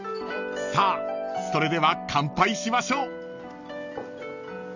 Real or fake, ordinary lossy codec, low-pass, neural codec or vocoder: real; none; 7.2 kHz; none